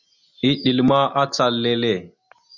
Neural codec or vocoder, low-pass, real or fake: none; 7.2 kHz; real